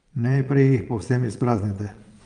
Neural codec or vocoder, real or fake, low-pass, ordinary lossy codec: vocoder, 22.05 kHz, 80 mel bands, Vocos; fake; 9.9 kHz; Opus, 32 kbps